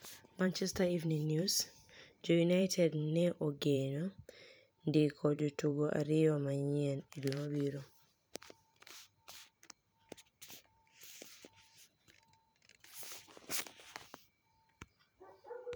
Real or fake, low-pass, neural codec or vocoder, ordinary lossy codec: fake; none; vocoder, 44.1 kHz, 128 mel bands every 512 samples, BigVGAN v2; none